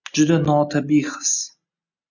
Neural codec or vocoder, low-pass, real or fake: none; 7.2 kHz; real